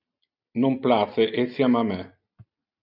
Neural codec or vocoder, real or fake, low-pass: none; real; 5.4 kHz